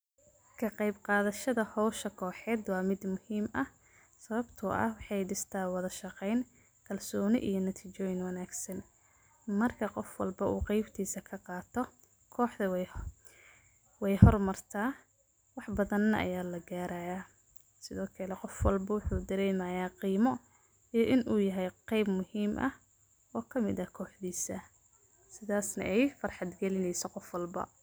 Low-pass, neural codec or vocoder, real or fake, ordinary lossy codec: none; none; real; none